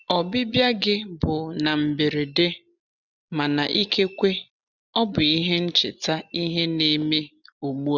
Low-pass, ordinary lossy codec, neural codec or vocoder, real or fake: 7.2 kHz; none; none; real